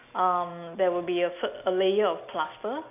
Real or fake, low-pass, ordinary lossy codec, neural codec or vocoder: real; 3.6 kHz; none; none